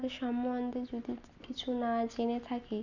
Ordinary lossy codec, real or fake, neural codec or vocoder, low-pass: none; real; none; 7.2 kHz